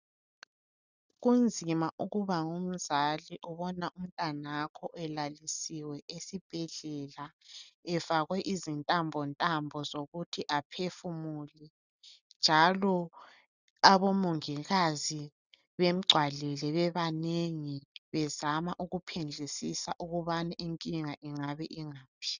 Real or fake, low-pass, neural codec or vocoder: real; 7.2 kHz; none